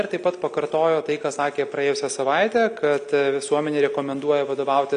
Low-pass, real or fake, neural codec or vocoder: 9.9 kHz; real; none